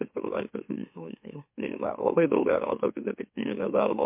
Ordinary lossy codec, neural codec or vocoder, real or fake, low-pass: MP3, 32 kbps; autoencoder, 44.1 kHz, a latent of 192 numbers a frame, MeloTTS; fake; 3.6 kHz